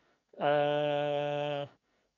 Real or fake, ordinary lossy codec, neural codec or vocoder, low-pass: fake; none; codec, 44.1 kHz, 2.6 kbps, SNAC; 7.2 kHz